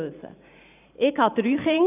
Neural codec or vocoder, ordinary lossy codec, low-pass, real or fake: vocoder, 44.1 kHz, 128 mel bands every 512 samples, BigVGAN v2; none; 3.6 kHz; fake